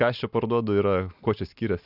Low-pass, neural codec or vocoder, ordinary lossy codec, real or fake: 5.4 kHz; none; Opus, 64 kbps; real